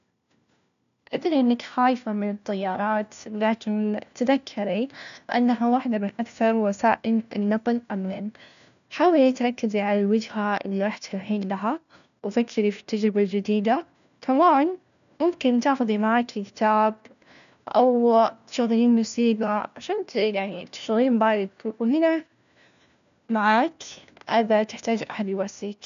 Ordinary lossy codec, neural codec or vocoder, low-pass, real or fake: none; codec, 16 kHz, 1 kbps, FunCodec, trained on LibriTTS, 50 frames a second; 7.2 kHz; fake